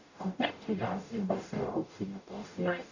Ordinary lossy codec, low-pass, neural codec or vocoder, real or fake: none; 7.2 kHz; codec, 44.1 kHz, 0.9 kbps, DAC; fake